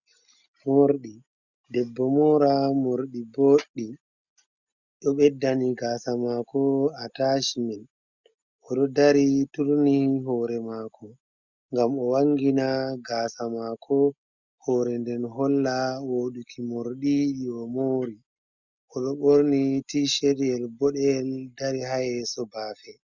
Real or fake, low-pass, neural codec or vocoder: real; 7.2 kHz; none